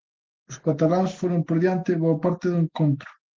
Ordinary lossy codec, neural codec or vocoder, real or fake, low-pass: Opus, 16 kbps; none; real; 7.2 kHz